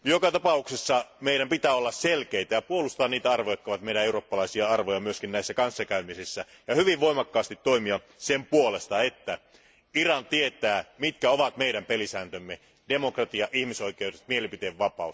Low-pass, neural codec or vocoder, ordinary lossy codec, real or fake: none; none; none; real